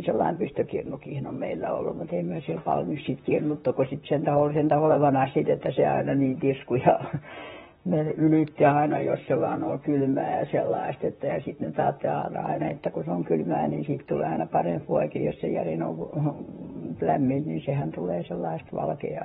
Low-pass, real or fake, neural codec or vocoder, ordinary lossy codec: 19.8 kHz; fake; vocoder, 44.1 kHz, 128 mel bands, Pupu-Vocoder; AAC, 16 kbps